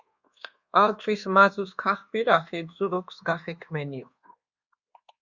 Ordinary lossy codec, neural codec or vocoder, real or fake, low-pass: Opus, 64 kbps; codec, 24 kHz, 1.2 kbps, DualCodec; fake; 7.2 kHz